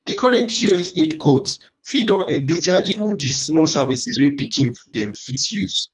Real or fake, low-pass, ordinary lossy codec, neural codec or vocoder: fake; 10.8 kHz; none; codec, 24 kHz, 1.5 kbps, HILCodec